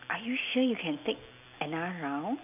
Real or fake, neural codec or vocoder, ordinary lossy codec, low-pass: real; none; none; 3.6 kHz